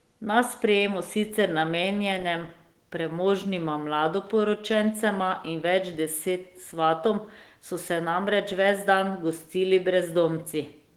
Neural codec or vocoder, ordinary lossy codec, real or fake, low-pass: autoencoder, 48 kHz, 128 numbers a frame, DAC-VAE, trained on Japanese speech; Opus, 16 kbps; fake; 19.8 kHz